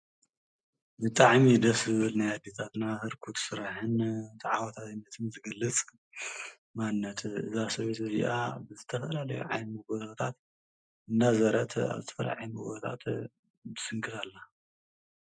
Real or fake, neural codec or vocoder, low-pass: real; none; 9.9 kHz